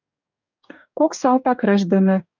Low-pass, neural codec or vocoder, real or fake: 7.2 kHz; codec, 44.1 kHz, 2.6 kbps, DAC; fake